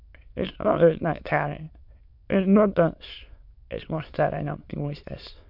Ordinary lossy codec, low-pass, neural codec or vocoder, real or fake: MP3, 48 kbps; 5.4 kHz; autoencoder, 22.05 kHz, a latent of 192 numbers a frame, VITS, trained on many speakers; fake